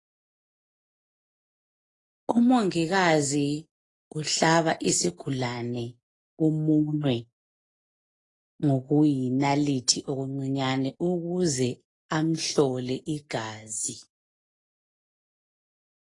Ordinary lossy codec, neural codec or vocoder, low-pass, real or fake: AAC, 32 kbps; none; 10.8 kHz; real